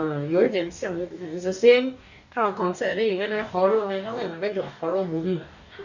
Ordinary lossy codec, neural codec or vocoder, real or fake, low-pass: none; codec, 44.1 kHz, 2.6 kbps, DAC; fake; 7.2 kHz